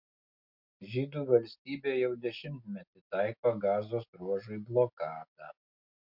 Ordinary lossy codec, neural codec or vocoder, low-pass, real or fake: MP3, 48 kbps; none; 5.4 kHz; real